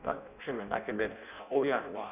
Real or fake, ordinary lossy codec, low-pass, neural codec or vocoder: fake; none; 3.6 kHz; codec, 16 kHz in and 24 kHz out, 0.6 kbps, FireRedTTS-2 codec